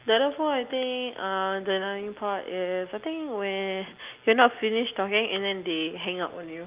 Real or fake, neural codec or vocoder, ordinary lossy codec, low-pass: real; none; Opus, 24 kbps; 3.6 kHz